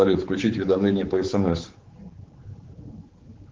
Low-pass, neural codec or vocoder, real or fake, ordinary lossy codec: 7.2 kHz; codec, 16 kHz, 4 kbps, X-Codec, HuBERT features, trained on general audio; fake; Opus, 16 kbps